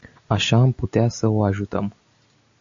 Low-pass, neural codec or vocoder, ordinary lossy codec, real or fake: 7.2 kHz; none; AAC, 64 kbps; real